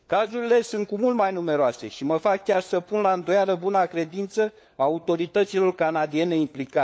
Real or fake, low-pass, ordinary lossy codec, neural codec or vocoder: fake; none; none; codec, 16 kHz, 4 kbps, FunCodec, trained on LibriTTS, 50 frames a second